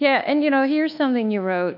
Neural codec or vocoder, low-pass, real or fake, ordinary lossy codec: codec, 24 kHz, 1.2 kbps, DualCodec; 5.4 kHz; fake; AAC, 48 kbps